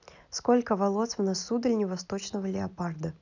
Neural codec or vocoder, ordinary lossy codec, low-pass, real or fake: none; none; 7.2 kHz; real